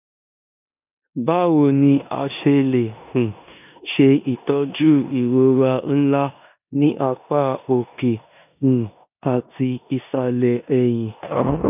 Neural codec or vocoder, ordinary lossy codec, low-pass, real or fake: codec, 16 kHz in and 24 kHz out, 0.9 kbps, LongCat-Audio-Codec, four codebook decoder; none; 3.6 kHz; fake